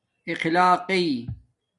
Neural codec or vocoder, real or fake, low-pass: none; real; 10.8 kHz